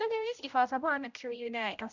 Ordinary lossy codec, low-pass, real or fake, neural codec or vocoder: none; 7.2 kHz; fake; codec, 16 kHz, 0.5 kbps, X-Codec, HuBERT features, trained on general audio